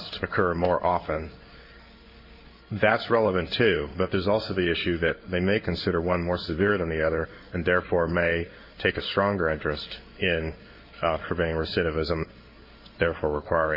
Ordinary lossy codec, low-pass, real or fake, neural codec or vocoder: MP3, 48 kbps; 5.4 kHz; fake; codec, 16 kHz in and 24 kHz out, 1 kbps, XY-Tokenizer